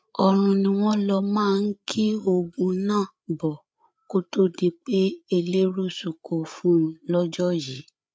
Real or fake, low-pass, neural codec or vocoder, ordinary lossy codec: fake; none; codec, 16 kHz, 8 kbps, FreqCodec, larger model; none